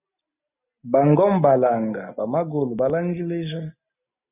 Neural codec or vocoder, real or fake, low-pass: none; real; 3.6 kHz